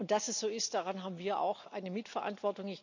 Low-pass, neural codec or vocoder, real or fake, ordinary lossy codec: 7.2 kHz; none; real; none